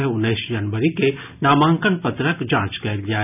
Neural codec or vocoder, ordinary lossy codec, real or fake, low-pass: none; none; real; 3.6 kHz